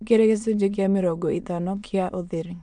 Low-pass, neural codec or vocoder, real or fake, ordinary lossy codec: 9.9 kHz; autoencoder, 22.05 kHz, a latent of 192 numbers a frame, VITS, trained on many speakers; fake; none